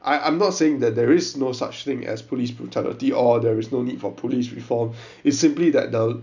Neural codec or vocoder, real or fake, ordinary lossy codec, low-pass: none; real; none; 7.2 kHz